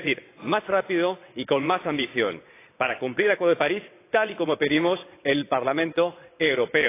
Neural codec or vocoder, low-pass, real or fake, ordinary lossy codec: vocoder, 22.05 kHz, 80 mel bands, WaveNeXt; 3.6 kHz; fake; AAC, 24 kbps